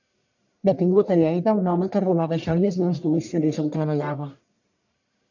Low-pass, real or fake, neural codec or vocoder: 7.2 kHz; fake; codec, 44.1 kHz, 1.7 kbps, Pupu-Codec